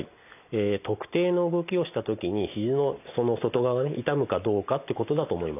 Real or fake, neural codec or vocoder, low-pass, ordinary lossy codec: real; none; 3.6 kHz; none